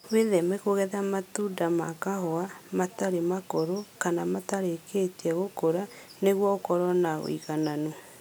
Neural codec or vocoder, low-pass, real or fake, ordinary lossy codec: none; none; real; none